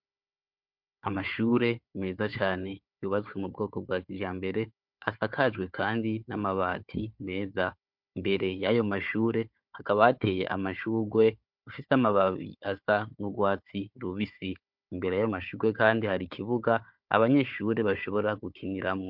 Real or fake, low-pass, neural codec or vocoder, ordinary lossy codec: fake; 5.4 kHz; codec, 16 kHz, 4 kbps, FunCodec, trained on Chinese and English, 50 frames a second; MP3, 48 kbps